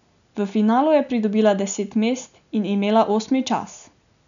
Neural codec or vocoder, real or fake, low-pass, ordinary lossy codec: none; real; 7.2 kHz; none